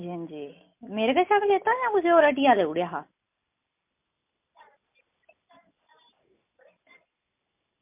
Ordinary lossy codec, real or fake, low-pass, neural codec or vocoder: MP3, 32 kbps; real; 3.6 kHz; none